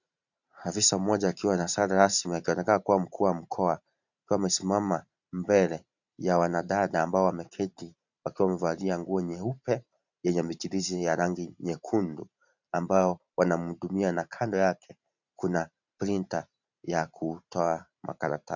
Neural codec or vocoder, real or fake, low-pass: vocoder, 44.1 kHz, 128 mel bands every 512 samples, BigVGAN v2; fake; 7.2 kHz